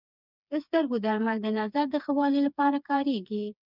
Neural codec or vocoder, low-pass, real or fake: codec, 16 kHz, 4 kbps, FreqCodec, smaller model; 5.4 kHz; fake